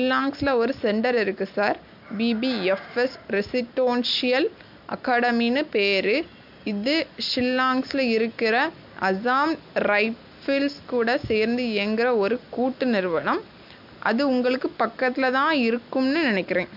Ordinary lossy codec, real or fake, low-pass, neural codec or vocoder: MP3, 48 kbps; real; 5.4 kHz; none